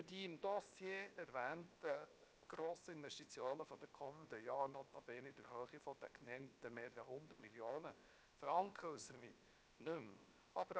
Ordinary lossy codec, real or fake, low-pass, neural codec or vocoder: none; fake; none; codec, 16 kHz, 0.7 kbps, FocalCodec